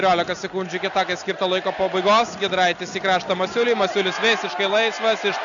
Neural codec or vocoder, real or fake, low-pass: none; real; 7.2 kHz